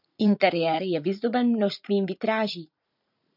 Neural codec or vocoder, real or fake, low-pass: vocoder, 44.1 kHz, 80 mel bands, Vocos; fake; 5.4 kHz